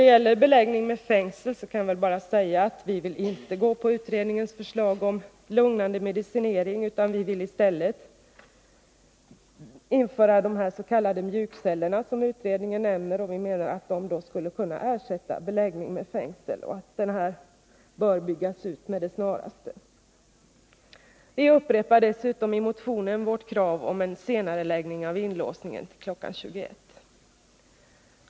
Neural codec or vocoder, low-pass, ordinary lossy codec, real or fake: none; none; none; real